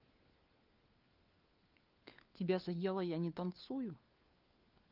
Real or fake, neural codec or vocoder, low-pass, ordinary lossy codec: fake; codec, 16 kHz, 4 kbps, FunCodec, trained on LibriTTS, 50 frames a second; 5.4 kHz; Opus, 32 kbps